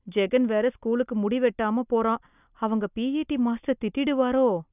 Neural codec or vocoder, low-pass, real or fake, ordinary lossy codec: none; 3.6 kHz; real; none